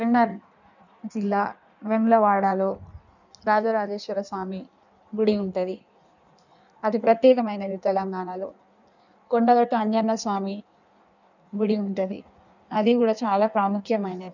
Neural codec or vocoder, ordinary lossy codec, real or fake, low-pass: codec, 16 kHz in and 24 kHz out, 1.1 kbps, FireRedTTS-2 codec; none; fake; 7.2 kHz